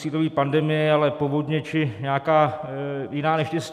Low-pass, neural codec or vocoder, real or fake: 14.4 kHz; none; real